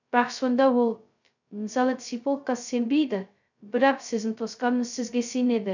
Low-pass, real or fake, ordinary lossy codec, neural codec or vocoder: 7.2 kHz; fake; none; codec, 16 kHz, 0.2 kbps, FocalCodec